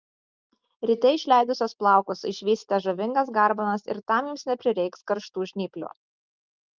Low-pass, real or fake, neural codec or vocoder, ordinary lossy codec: 7.2 kHz; real; none; Opus, 32 kbps